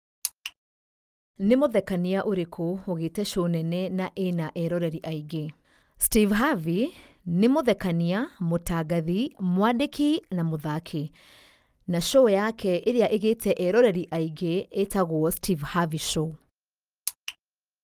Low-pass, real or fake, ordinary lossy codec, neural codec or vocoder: 14.4 kHz; real; Opus, 32 kbps; none